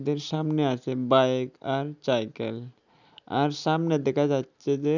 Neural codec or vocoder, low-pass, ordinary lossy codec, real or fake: none; 7.2 kHz; none; real